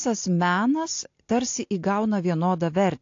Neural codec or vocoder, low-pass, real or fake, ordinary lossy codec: none; 7.2 kHz; real; AAC, 48 kbps